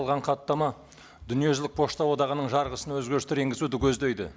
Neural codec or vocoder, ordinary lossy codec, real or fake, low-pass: none; none; real; none